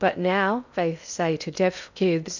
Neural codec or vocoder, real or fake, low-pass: codec, 16 kHz in and 24 kHz out, 0.6 kbps, FocalCodec, streaming, 2048 codes; fake; 7.2 kHz